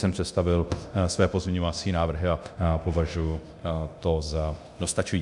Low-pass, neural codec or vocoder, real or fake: 10.8 kHz; codec, 24 kHz, 0.9 kbps, DualCodec; fake